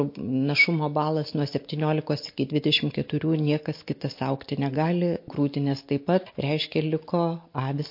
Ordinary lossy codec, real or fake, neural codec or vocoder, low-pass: MP3, 32 kbps; real; none; 5.4 kHz